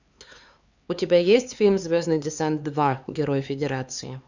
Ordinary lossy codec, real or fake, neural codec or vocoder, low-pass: Opus, 64 kbps; fake; codec, 16 kHz, 4 kbps, X-Codec, HuBERT features, trained on LibriSpeech; 7.2 kHz